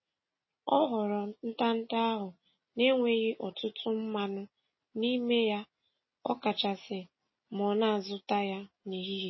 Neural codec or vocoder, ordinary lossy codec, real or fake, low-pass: none; MP3, 24 kbps; real; 7.2 kHz